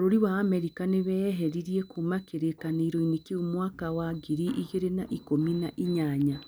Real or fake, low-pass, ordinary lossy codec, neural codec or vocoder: real; none; none; none